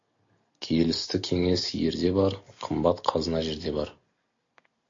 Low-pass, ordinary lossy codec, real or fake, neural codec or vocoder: 7.2 kHz; AAC, 48 kbps; real; none